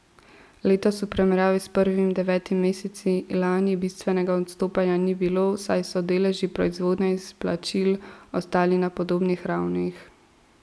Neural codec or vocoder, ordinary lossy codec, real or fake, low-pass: none; none; real; none